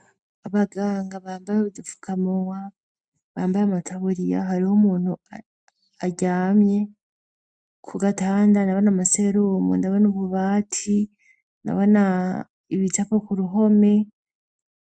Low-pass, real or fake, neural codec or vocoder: 9.9 kHz; real; none